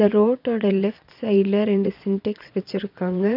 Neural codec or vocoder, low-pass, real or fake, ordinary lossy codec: vocoder, 22.05 kHz, 80 mel bands, Vocos; 5.4 kHz; fake; none